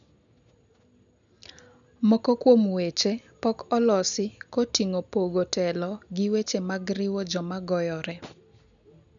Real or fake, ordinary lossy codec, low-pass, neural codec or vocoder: real; none; 7.2 kHz; none